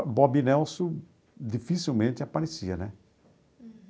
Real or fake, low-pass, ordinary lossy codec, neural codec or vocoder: real; none; none; none